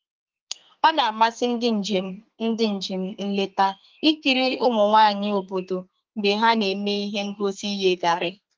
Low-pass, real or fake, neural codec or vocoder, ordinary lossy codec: 7.2 kHz; fake; codec, 32 kHz, 1.9 kbps, SNAC; Opus, 32 kbps